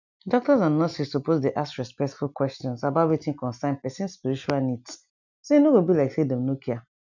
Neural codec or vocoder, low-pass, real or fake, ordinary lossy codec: none; 7.2 kHz; real; none